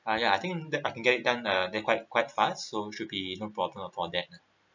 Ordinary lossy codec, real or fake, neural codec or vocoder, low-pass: none; real; none; 7.2 kHz